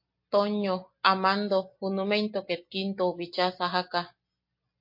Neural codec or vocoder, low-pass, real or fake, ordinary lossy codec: none; 5.4 kHz; real; MP3, 32 kbps